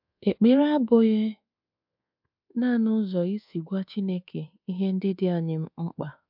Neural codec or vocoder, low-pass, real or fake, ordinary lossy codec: codec, 24 kHz, 1.2 kbps, DualCodec; 5.4 kHz; fake; MP3, 48 kbps